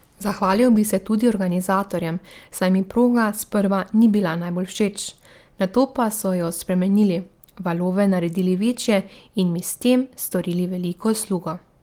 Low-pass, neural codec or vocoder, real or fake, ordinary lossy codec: 19.8 kHz; none; real; Opus, 24 kbps